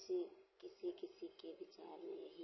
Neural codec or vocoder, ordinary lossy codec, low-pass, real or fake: none; MP3, 24 kbps; 7.2 kHz; real